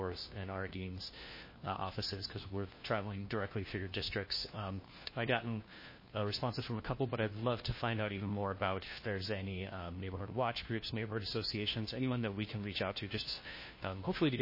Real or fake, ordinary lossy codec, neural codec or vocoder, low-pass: fake; MP3, 24 kbps; codec, 16 kHz, 1 kbps, FunCodec, trained on LibriTTS, 50 frames a second; 5.4 kHz